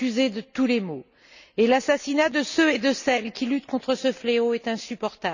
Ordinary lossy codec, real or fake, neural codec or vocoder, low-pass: none; real; none; 7.2 kHz